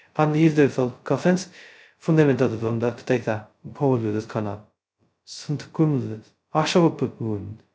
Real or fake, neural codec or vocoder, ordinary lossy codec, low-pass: fake; codec, 16 kHz, 0.2 kbps, FocalCodec; none; none